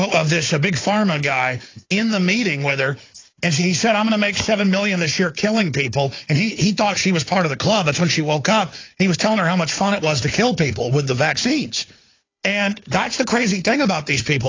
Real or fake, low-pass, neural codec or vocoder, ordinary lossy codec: fake; 7.2 kHz; codec, 16 kHz, 4 kbps, FunCodec, trained on Chinese and English, 50 frames a second; AAC, 32 kbps